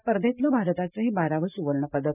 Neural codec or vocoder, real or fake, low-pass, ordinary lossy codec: none; real; 3.6 kHz; none